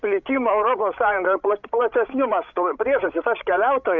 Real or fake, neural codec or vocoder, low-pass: fake; codec, 16 kHz, 16 kbps, FreqCodec, larger model; 7.2 kHz